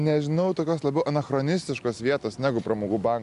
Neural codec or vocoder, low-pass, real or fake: none; 10.8 kHz; real